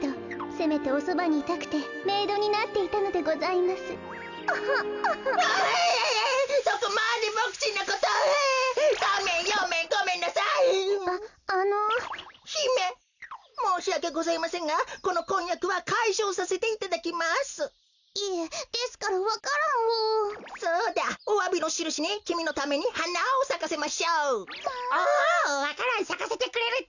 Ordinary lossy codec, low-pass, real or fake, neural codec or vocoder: none; 7.2 kHz; real; none